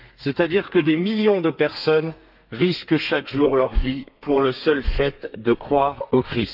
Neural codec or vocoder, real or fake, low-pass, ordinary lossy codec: codec, 32 kHz, 1.9 kbps, SNAC; fake; 5.4 kHz; none